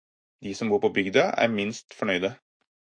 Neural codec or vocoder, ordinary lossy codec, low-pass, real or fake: autoencoder, 48 kHz, 128 numbers a frame, DAC-VAE, trained on Japanese speech; MP3, 48 kbps; 9.9 kHz; fake